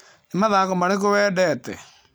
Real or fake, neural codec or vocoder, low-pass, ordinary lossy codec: real; none; none; none